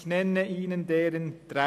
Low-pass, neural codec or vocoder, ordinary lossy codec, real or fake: 14.4 kHz; none; none; real